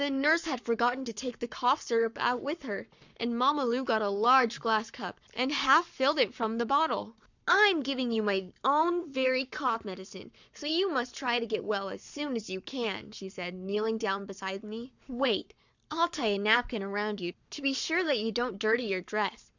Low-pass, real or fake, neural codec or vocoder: 7.2 kHz; fake; codec, 44.1 kHz, 7.8 kbps, Pupu-Codec